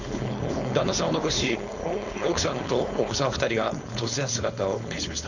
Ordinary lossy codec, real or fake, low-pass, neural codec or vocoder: none; fake; 7.2 kHz; codec, 16 kHz, 4.8 kbps, FACodec